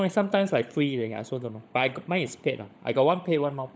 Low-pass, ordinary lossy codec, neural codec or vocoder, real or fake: none; none; codec, 16 kHz, 16 kbps, FunCodec, trained on LibriTTS, 50 frames a second; fake